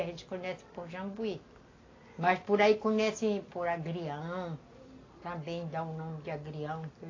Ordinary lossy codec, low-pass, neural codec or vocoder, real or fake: AAC, 32 kbps; 7.2 kHz; none; real